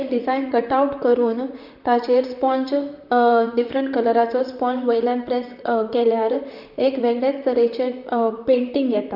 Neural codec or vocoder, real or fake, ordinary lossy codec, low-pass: vocoder, 44.1 kHz, 128 mel bands, Pupu-Vocoder; fake; none; 5.4 kHz